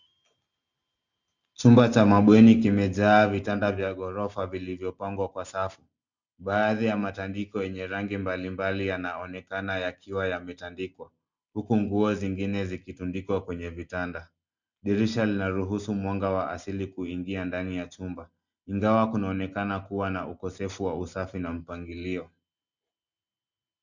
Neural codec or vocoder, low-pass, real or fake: none; 7.2 kHz; real